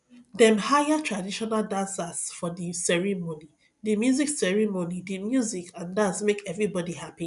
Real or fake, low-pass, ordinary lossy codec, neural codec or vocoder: real; 10.8 kHz; none; none